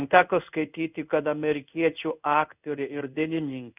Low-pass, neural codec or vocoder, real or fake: 3.6 kHz; codec, 16 kHz in and 24 kHz out, 1 kbps, XY-Tokenizer; fake